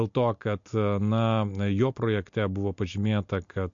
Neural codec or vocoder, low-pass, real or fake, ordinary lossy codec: none; 7.2 kHz; real; MP3, 48 kbps